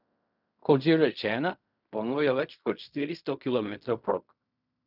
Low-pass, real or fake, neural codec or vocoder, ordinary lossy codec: 5.4 kHz; fake; codec, 16 kHz in and 24 kHz out, 0.4 kbps, LongCat-Audio-Codec, fine tuned four codebook decoder; none